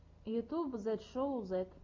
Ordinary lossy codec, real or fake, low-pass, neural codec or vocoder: MP3, 48 kbps; real; 7.2 kHz; none